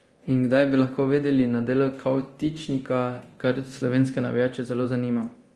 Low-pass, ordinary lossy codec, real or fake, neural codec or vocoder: 10.8 kHz; Opus, 24 kbps; fake; codec, 24 kHz, 0.9 kbps, DualCodec